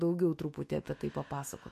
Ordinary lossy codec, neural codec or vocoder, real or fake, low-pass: MP3, 64 kbps; autoencoder, 48 kHz, 128 numbers a frame, DAC-VAE, trained on Japanese speech; fake; 14.4 kHz